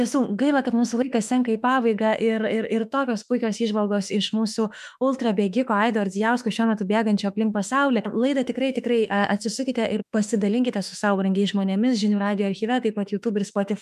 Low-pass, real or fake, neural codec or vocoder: 14.4 kHz; fake; autoencoder, 48 kHz, 32 numbers a frame, DAC-VAE, trained on Japanese speech